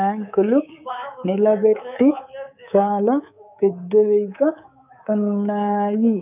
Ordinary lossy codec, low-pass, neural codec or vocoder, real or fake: none; 3.6 kHz; codec, 24 kHz, 3.1 kbps, DualCodec; fake